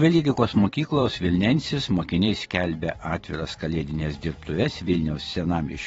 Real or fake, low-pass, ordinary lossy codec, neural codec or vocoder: fake; 19.8 kHz; AAC, 24 kbps; vocoder, 44.1 kHz, 128 mel bands every 512 samples, BigVGAN v2